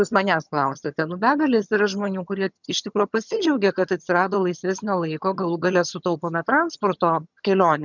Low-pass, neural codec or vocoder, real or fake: 7.2 kHz; vocoder, 22.05 kHz, 80 mel bands, HiFi-GAN; fake